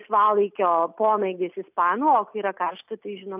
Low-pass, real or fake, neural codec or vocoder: 3.6 kHz; real; none